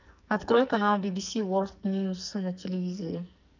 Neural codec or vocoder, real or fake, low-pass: codec, 44.1 kHz, 2.6 kbps, SNAC; fake; 7.2 kHz